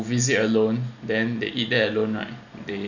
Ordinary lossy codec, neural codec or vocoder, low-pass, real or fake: none; none; 7.2 kHz; real